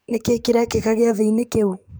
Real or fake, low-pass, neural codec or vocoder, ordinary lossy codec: fake; none; codec, 44.1 kHz, 7.8 kbps, DAC; none